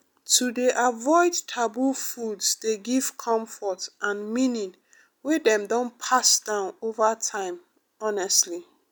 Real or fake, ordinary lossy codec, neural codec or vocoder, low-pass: real; none; none; none